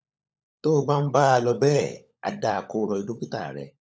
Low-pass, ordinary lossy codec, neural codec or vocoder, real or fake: none; none; codec, 16 kHz, 16 kbps, FunCodec, trained on LibriTTS, 50 frames a second; fake